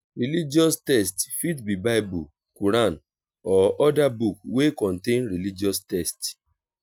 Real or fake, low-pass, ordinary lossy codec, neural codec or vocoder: real; none; none; none